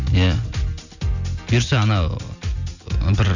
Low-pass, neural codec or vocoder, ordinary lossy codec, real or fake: 7.2 kHz; none; none; real